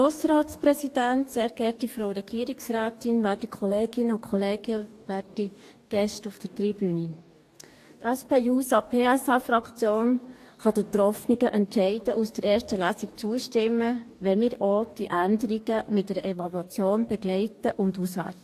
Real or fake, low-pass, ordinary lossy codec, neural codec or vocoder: fake; 14.4 kHz; AAC, 64 kbps; codec, 44.1 kHz, 2.6 kbps, DAC